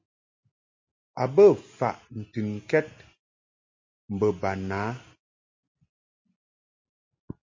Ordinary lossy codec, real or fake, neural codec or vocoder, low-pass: MP3, 32 kbps; real; none; 7.2 kHz